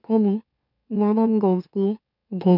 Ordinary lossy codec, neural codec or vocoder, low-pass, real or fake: none; autoencoder, 44.1 kHz, a latent of 192 numbers a frame, MeloTTS; 5.4 kHz; fake